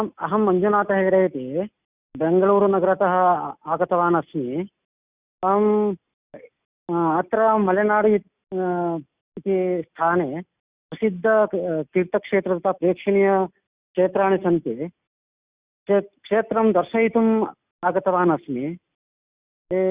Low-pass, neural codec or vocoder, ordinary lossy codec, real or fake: 3.6 kHz; none; Opus, 64 kbps; real